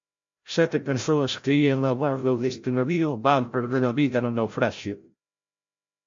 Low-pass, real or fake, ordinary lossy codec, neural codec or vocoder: 7.2 kHz; fake; MP3, 48 kbps; codec, 16 kHz, 0.5 kbps, FreqCodec, larger model